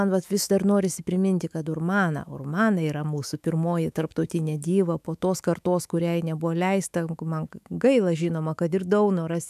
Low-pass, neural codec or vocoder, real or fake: 14.4 kHz; autoencoder, 48 kHz, 128 numbers a frame, DAC-VAE, trained on Japanese speech; fake